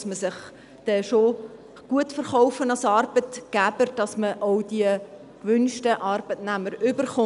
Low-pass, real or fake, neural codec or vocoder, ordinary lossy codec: 10.8 kHz; real; none; none